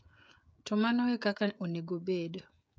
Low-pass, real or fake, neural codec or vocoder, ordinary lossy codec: none; fake; codec, 16 kHz, 16 kbps, FreqCodec, smaller model; none